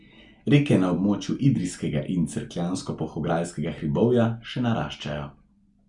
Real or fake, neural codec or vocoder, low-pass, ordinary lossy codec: real; none; 10.8 kHz; Opus, 64 kbps